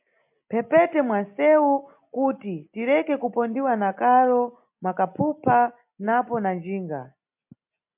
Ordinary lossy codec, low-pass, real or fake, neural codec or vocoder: MP3, 32 kbps; 3.6 kHz; real; none